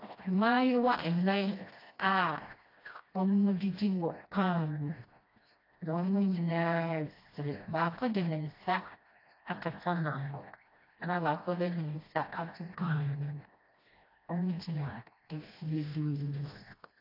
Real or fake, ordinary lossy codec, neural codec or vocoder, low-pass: fake; AAC, 32 kbps; codec, 16 kHz, 1 kbps, FreqCodec, smaller model; 5.4 kHz